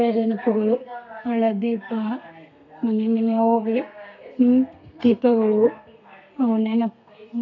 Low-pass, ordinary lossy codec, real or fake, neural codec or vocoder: 7.2 kHz; none; fake; codec, 32 kHz, 1.9 kbps, SNAC